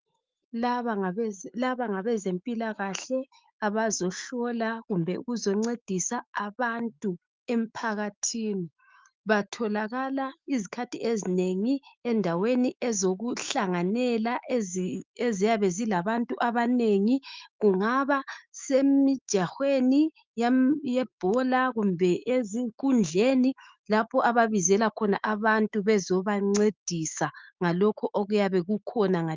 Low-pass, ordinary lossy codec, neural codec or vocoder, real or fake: 7.2 kHz; Opus, 24 kbps; autoencoder, 48 kHz, 128 numbers a frame, DAC-VAE, trained on Japanese speech; fake